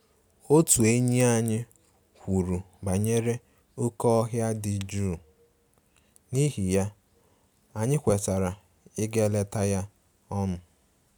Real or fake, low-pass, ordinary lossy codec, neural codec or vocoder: real; none; none; none